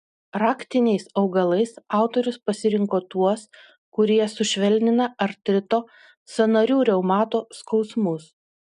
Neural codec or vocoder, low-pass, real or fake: none; 10.8 kHz; real